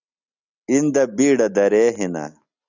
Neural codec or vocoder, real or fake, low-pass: none; real; 7.2 kHz